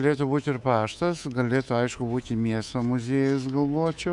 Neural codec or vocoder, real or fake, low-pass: codec, 24 kHz, 3.1 kbps, DualCodec; fake; 10.8 kHz